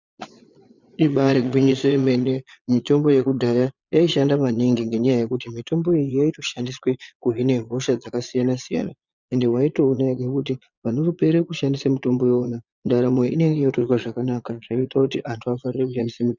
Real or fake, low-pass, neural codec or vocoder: fake; 7.2 kHz; vocoder, 22.05 kHz, 80 mel bands, Vocos